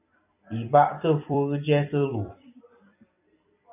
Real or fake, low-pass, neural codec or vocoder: fake; 3.6 kHz; vocoder, 44.1 kHz, 128 mel bands every 256 samples, BigVGAN v2